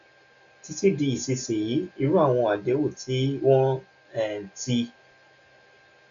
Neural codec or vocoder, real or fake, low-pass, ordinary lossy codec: none; real; 7.2 kHz; none